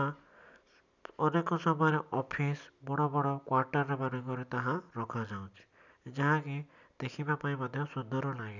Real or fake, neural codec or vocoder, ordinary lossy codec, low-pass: real; none; none; 7.2 kHz